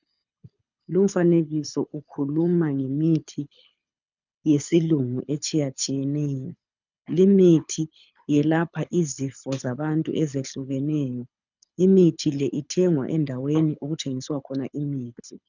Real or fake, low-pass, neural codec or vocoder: fake; 7.2 kHz; codec, 24 kHz, 6 kbps, HILCodec